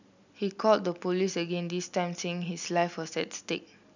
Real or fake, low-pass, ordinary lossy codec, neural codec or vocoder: real; 7.2 kHz; none; none